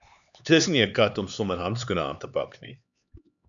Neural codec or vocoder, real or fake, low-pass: codec, 16 kHz, 4 kbps, X-Codec, HuBERT features, trained on LibriSpeech; fake; 7.2 kHz